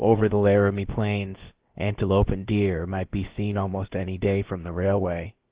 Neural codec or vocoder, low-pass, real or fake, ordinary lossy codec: codec, 16 kHz, about 1 kbps, DyCAST, with the encoder's durations; 3.6 kHz; fake; Opus, 16 kbps